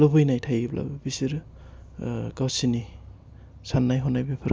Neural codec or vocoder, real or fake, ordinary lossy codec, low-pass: none; real; none; none